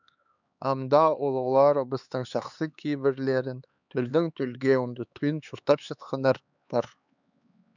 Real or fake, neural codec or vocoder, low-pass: fake; codec, 16 kHz, 4 kbps, X-Codec, HuBERT features, trained on LibriSpeech; 7.2 kHz